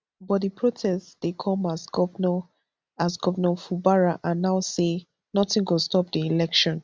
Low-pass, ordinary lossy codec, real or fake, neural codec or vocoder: none; none; real; none